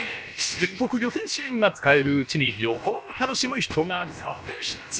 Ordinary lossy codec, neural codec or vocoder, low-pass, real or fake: none; codec, 16 kHz, about 1 kbps, DyCAST, with the encoder's durations; none; fake